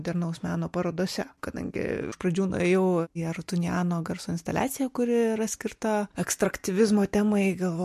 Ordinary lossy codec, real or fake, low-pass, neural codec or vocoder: MP3, 64 kbps; real; 14.4 kHz; none